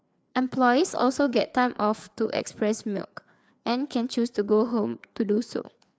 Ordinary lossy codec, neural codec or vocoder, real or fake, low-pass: none; codec, 16 kHz, 4 kbps, FreqCodec, larger model; fake; none